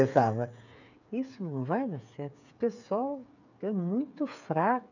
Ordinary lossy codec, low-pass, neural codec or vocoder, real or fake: none; 7.2 kHz; codec, 16 kHz, 8 kbps, FreqCodec, smaller model; fake